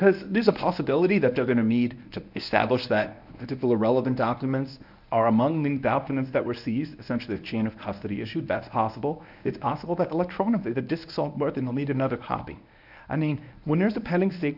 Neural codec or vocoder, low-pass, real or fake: codec, 24 kHz, 0.9 kbps, WavTokenizer, medium speech release version 1; 5.4 kHz; fake